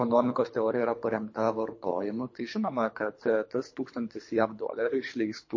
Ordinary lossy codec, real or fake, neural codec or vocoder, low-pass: MP3, 32 kbps; fake; codec, 24 kHz, 3 kbps, HILCodec; 7.2 kHz